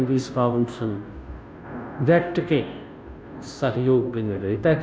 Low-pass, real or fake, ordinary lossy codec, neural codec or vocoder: none; fake; none; codec, 16 kHz, 0.5 kbps, FunCodec, trained on Chinese and English, 25 frames a second